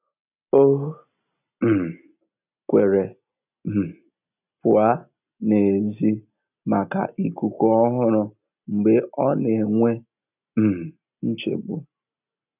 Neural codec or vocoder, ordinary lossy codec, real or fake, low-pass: none; none; real; 3.6 kHz